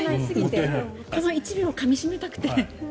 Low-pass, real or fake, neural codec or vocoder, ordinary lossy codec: none; real; none; none